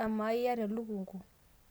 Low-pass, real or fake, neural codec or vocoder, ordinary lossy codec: none; real; none; none